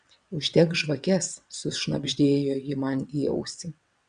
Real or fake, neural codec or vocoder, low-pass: fake; vocoder, 22.05 kHz, 80 mel bands, WaveNeXt; 9.9 kHz